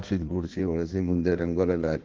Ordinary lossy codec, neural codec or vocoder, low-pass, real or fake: Opus, 16 kbps; codec, 16 kHz in and 24 kHz out, 1.1 kbps, FireRedTTS-2 codec; 7.2 kHz; fake